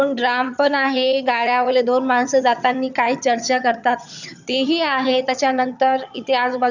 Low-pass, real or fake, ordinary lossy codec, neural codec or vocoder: 7.2 kHz; fake; none; vocoder, 22.05 kHz, 80 mel bands, HiFi-GAN